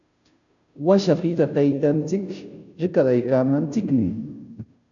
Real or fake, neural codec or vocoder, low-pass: fake; codec, 16 kHz, 0.5 kbps, FunCodec, trained on Chinese and English, 25 frames a second; 7.2 kHz